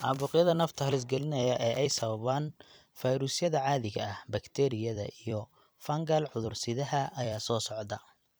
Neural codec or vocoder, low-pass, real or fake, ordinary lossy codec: vocoder, 44.1 kHz, 128 mel bands every 256 samples, BigVGAN v2; none; fake; none